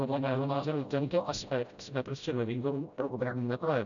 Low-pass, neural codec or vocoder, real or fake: 7.2 kHz; codec, 16 kHz, 0.5 kbps, FreqCodec, smaller model; fake